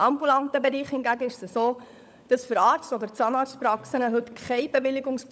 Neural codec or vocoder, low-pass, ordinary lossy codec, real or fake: codec, 16 kHz, 16 kbps, FunCodec, trained on LibriTTS, 50 frames a second; none; none; fake